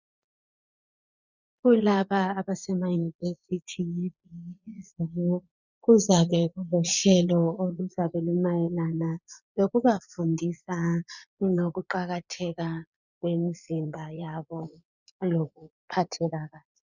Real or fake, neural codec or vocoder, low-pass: fake; vocoder, 22.05 kHz, 80 mel bands, Vocos; 7.2 kHz